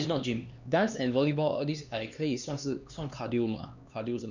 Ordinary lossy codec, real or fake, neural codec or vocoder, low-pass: none; fake; codec, 16 kHz, 2 kbps, X-Codec, HuBERT features, trained on LibriSpeech; 7.2 kHz